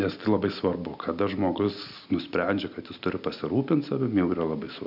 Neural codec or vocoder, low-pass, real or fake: none; 5.4 kHz; real